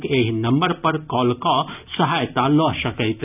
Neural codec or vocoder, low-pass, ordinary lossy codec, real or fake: none; 3.6 kHz; none; real